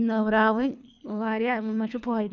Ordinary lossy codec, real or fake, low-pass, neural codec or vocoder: none; fake; 7.2 kHz; codec, 24 kHz, 3 kbps, HILCodec